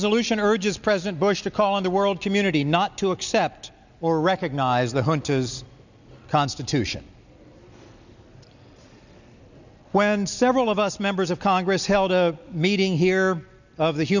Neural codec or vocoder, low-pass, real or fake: none; 7.2 kHz; real